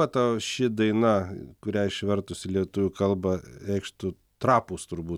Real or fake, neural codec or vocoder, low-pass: real; none; 19.8 kHz